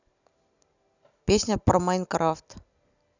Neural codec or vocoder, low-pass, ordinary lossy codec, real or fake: none; 7.2 kHz; none; real